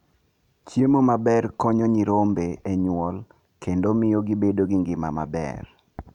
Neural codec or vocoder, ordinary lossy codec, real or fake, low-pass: none; none; real; 19.8 kHz